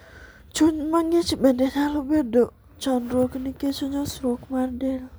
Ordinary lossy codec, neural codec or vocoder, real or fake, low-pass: none; none; real; none